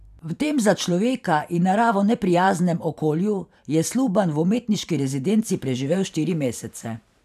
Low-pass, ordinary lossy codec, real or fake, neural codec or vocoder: 14.4 kHz; none; fake; vocoder, 44.1 kHz, 128 mel bands every 512 samples, BigVGAN v2